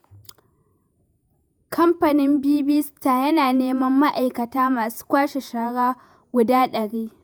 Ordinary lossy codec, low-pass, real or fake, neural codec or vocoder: none; none; fake; vocoder, 48 kHz, 128 mel bands, Vocos